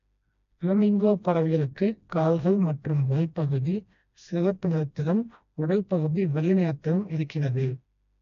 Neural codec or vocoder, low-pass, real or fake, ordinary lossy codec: codec, 16 kHz, 1 kbps, FreqCodec, smaller model; 7.2 kHz; fake; AAC, 64 kbps